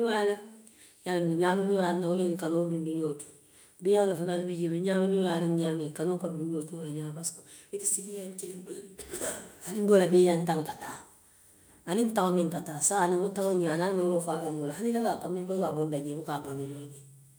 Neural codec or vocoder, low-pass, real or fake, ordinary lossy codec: autoencoder, 48 kHz, 32 numbers a frame, DAC-VAE, trained on Japanese speech; none; fake; none